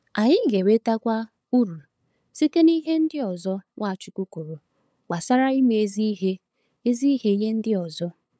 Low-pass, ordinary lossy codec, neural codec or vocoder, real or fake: none; none; codec, 16 kHz, 8 kbps, FunCodec, trained on LibriTTS, 25 frames a second; fake